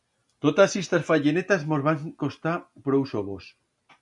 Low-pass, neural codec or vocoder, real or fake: 10.8 kHz; none; real